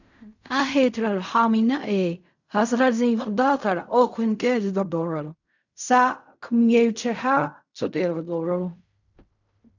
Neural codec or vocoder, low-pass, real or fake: codec, 16 kHz in and 24 kHz out, 0.4 kbps, LongCat-Audio-Codec, fine tuned four codebook decoder; 7.2 kHz; fake